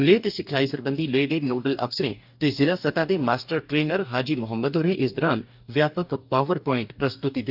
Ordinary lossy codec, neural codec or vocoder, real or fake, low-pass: none; codec, 44.1 kHz, 2.6 kbps, DAC; fake; 5.4 kHz